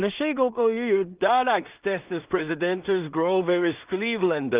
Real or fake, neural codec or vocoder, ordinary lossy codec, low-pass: fake; codec, 16 kHz in and 24 kHz out, 0.4 kbps, LongCat-Audio-Codec, two codebook decoder; Opus, 32 kbps; 3.6 kHz